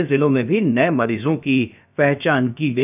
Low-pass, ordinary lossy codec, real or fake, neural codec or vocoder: 3.6 kHz; none; fake; codec, 16 kHz, about 1 kbps, DyCAST, with the encoder's durations